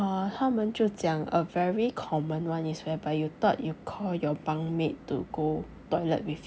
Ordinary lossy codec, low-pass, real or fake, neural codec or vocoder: none; none; real; none